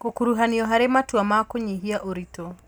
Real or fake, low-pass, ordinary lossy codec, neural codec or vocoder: real; none; none; none